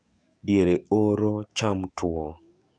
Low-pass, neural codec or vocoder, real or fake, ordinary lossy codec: 9.9 kHz; codec, 44.1 kHz, 7.8 kbps, DAC; fake; none